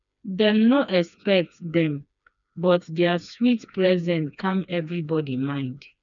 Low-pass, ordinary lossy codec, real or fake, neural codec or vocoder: 7.2 kHz; none; fake; codec, 16 kHz, 2 kbps, FreqCodec, smaller model